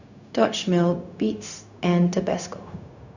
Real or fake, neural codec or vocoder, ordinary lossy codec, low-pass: fake; codec, 16 kHz, 0.4 kbps, LongCat-Audio-Codec; none; 7.2 kHz